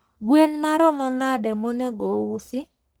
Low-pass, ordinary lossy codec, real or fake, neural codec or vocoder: none; none; fake; codec, 44.1 kHz, 1.7 kbps, Pupu-Codec